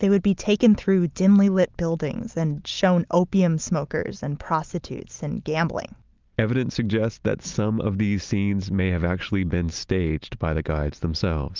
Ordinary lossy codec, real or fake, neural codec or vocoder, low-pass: Opus, 24 kbps; real; none; 7.2 kHz